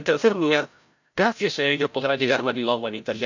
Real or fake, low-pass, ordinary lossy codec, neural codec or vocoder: fake; 7.2 kHz; none; codec, 16 kHz, 0.5 kbps, FreqCodec, larger model